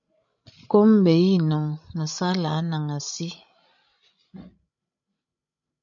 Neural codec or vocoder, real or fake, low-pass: codec, 16 kHz, 16 kbps, FreqCodec, larger model; fake; 7.2 kHz